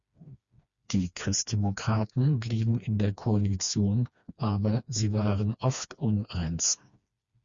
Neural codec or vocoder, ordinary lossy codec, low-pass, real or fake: codec, 16 kHz, 2 kbps, FreqCodec, smaller model; Opus, 64 kbps; 7.2 kHz; fake